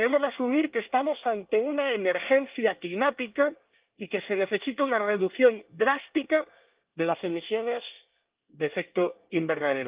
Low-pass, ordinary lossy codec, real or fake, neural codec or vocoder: 3.6 kHz; Opus, 32 kbps; fake; codec, 24 kHz, 1 kbps, SNAC